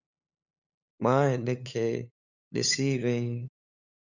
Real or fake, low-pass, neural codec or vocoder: fake; 7.2 kHz; codec, 16 kHz, 8 kbps, FunCodec, trained on LibriTTS, 25 frames a second